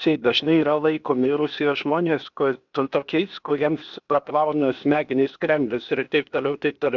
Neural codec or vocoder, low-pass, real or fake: codec, 16 kHz, 0.8 kbps, ZipCodec; 7.2 kHz; fake